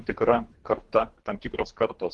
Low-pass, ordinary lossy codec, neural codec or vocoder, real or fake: 10.8 kHz; Opus, 16 kbps; codec, 24 kHz, 3 kbps, HILCodec; fake